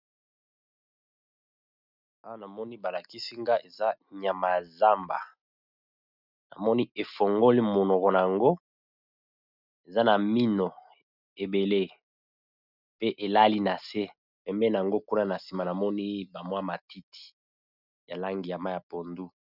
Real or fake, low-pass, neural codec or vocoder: real; 5.4 kHz; none